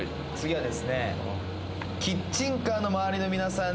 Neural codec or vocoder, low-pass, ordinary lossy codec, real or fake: none; none; none; real